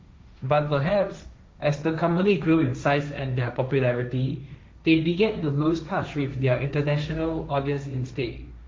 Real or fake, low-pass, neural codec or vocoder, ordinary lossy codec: fake; none; codec, 16 kHz, 1.1 kbps, Voila-Tokenizer; none